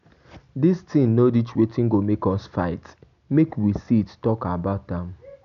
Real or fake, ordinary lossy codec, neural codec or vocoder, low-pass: real; none; none; 7.2 kHz